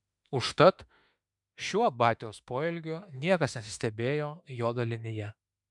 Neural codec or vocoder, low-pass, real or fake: autoencoder, 48 kHz, 32 numbers a frame, DAC-VAE, trained on Japanese speech; 10.8 kHz; fake